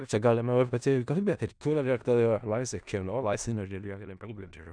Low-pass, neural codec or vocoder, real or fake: 9.9 kHz; codec, 16 kHz in and 24 kHz out, 0.4 kbps, LongCat-Audio-Codec, four codebook decoder; fake